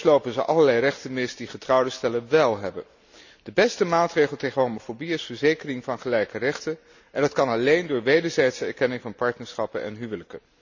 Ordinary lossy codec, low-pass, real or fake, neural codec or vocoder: none; 7.2 kHz; real; none